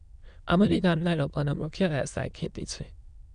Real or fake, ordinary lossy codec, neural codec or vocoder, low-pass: fake; none; autoencoder, 22.05 kHz, a latent of 192 numbers a frame, VITS, trained on many speakers; 9.9 kHz